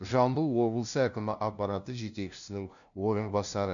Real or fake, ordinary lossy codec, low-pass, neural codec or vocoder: fake; none; 7.2 kHz; codec, 16 kHz, 0.5 kbps, FunCodec, trained on LibriTTS, 25 frames a second